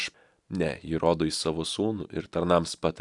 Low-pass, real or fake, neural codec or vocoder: 10.8 kHz; real; none